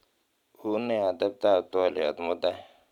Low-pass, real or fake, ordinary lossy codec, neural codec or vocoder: 19.8 kHz; fake; none; vocoder, 44.1 kHz, 128 mel bands every 512 samples, BigVGAN v2